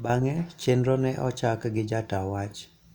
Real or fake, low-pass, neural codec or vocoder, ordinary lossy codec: real; 19.8 kHz; none; Opus, 64 kbps